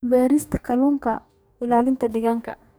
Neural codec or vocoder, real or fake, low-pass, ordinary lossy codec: codec, 44.1 kHz, 2.6 kbps, DAC; fake; none; none